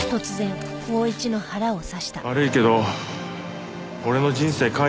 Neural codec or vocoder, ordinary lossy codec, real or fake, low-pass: none; none; real; none